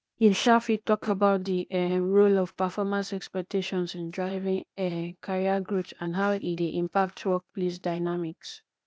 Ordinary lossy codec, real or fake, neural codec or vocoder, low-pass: none; fake; codec, 16 kHz, 0.8 kbps, ZipCodec; none